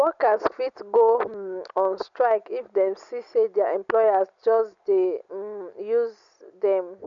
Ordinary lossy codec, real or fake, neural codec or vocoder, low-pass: none; real; none; 7.2 kHz